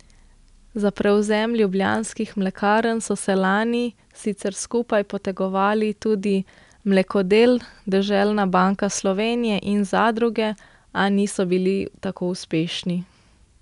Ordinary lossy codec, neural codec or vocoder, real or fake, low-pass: none; none; real; 10.8 kHz